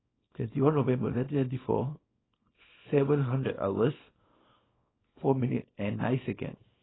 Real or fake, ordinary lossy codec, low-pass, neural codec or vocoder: fake; AAC, 16 kbps; 7.2 kHz; codec, 24 kHz, 0.9 kbps, WavTokenizer, small release